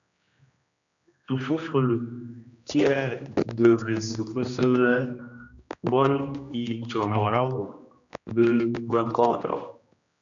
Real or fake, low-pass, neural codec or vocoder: fake; 7.2 kHz; codec, 16 kHz, 1 kbps, X-Codec, HuBERT features, trained on general audio